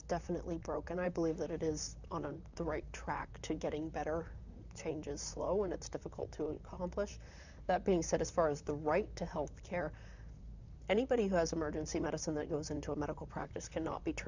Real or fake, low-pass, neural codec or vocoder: fake; 7.2 kHz; vocoder, 44.1 kHz, 128 mel bands, Pupu-Vocoder